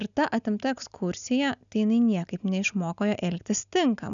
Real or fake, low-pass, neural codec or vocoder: real; 7.2 kHz; none